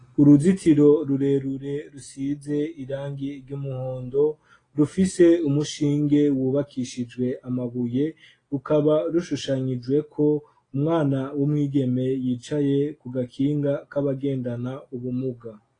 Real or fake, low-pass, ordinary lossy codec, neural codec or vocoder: real; 9.9 kHz; AAC, 32 kbps; none